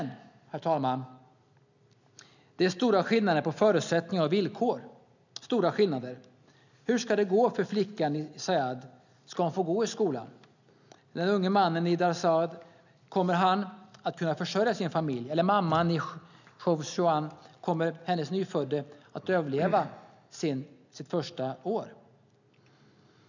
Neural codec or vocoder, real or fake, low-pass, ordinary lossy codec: none; real; 7.2 kHz; none